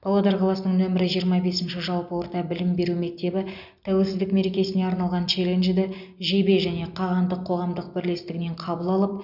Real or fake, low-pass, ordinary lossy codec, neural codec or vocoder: real; 5.4 kHz; none; none